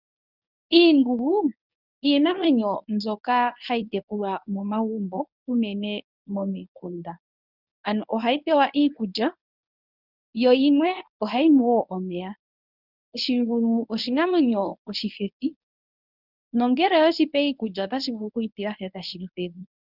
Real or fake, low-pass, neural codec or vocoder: fake; 5.4 kHz; codec, 24 kHz, 0.9 kbps, WavTokenizer, medium speech release version 1